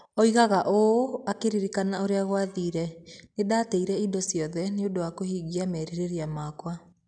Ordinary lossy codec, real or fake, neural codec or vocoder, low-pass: none; real; none; 9.9 kHz